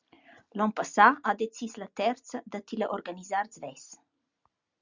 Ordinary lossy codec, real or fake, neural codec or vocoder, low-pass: Opus, 64 kbps; real; none; 7.2 kHz